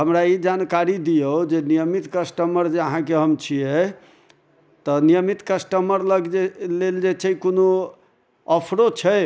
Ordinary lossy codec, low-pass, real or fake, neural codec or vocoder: none; none; real; none